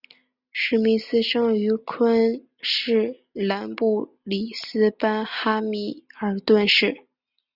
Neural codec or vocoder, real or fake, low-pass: none; real; 5.4 kHz